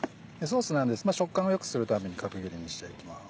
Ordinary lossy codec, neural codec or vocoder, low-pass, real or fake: none; none; none; real